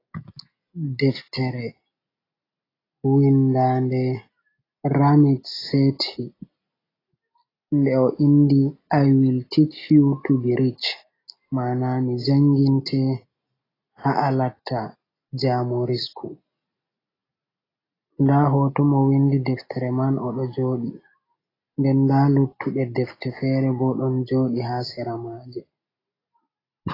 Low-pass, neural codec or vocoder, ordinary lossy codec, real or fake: 5.4 kHz; none; AAC, 24 kbps; real